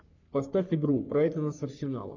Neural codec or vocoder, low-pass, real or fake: codec, 44.1 kHz, 3.4 kbps, Pupu-Codec; 7.2 kHz; fake